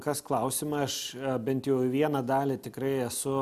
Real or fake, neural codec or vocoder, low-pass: real; none; 14.4 kHz